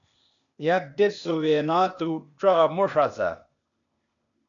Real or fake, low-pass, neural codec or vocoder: fake; 7.2 kHz; codec, 16 kHz, 0.8 kbps, ZipCodec